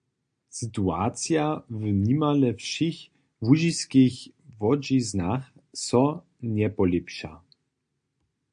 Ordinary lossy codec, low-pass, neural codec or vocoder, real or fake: AAC, 64 kbps; 9.9 kHz; none; real